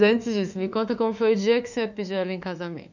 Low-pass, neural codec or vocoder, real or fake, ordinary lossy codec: 7.2 kHz; autoencoder, 48 kHz, 32 numbers a frame, DAC-VAE, trained on Japanese speech; fake; none